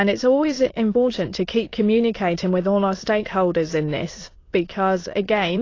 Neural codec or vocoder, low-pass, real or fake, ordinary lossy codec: autoencoder, 22.05 kHz, a latent of 192 numbers a frame, VITS, trained on many speakers; 7.2 kHz; fake; AAC, 32 kbps